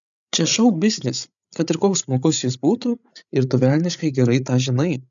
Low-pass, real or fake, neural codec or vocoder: 7.2 kHz; fake; codec, 16 kHz, 8 kbps, FreqCodec, larger model